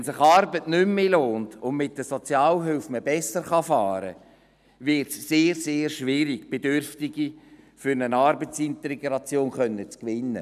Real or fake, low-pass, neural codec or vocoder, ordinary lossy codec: real; 14.4 kHz; none; none